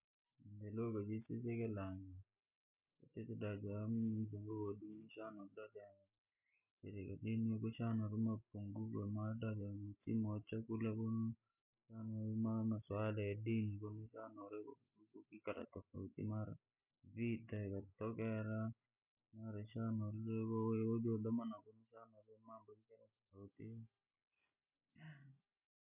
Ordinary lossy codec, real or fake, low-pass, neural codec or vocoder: none; real; 3.6 kHz; none